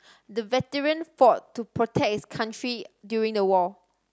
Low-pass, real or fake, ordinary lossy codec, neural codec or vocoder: none; real; none; none